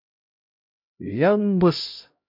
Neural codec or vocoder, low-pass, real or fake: codec, 16 kHz, 0.5 kbps, X-Codec, HuBERT features, trained on LibriSpeech; 5.4 kHz; fake